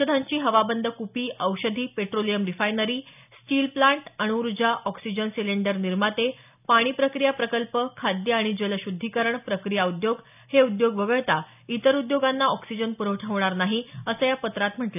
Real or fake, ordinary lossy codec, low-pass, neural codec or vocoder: real; none; 3.6 kHz; none